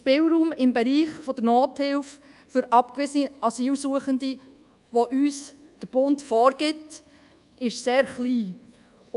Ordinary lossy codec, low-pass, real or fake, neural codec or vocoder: none; 10.8 kHz; fake; codec, 24 kHz, 1.2 kbps, DualCodec